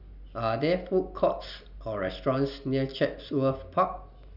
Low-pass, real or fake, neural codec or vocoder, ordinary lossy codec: 5.4 kHz; real; none; none